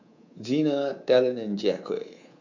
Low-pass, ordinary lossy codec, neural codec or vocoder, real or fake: 7.2 kHz; AAC, 48 kbps; codec, 24 kHz, 3.1 kbps, DualCodec; fake